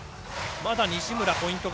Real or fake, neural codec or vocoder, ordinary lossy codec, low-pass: real; none; none; none